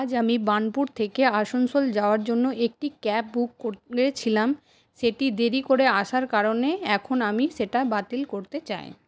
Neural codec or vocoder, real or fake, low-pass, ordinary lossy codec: none; real; none; none